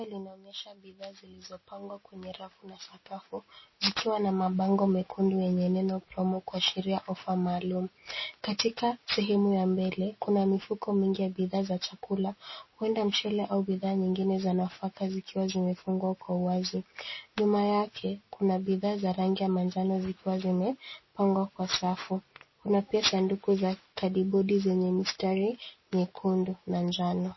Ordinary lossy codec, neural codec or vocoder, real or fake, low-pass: MP3, 24 kbps; none; real; 7.2 kHz